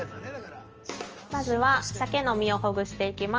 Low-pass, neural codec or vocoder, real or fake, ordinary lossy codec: 7.2 kHz; none; real; Opus, 24 kbps